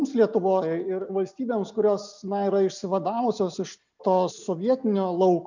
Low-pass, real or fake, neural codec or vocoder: 7.2 kHz; real; none